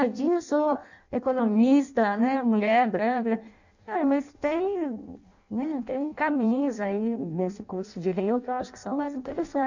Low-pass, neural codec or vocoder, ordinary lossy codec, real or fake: 7.2 kHz; codec, 16 kHz in and 24 kHz out, 0.6 kbps, FireRedTTS-2 codec; none; fake